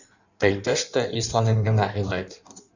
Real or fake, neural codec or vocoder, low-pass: fake; codec, 16 kHz in and 24 kHz out, 1.1 kbps, FireRedTTS-2 codec; 7.2 kHz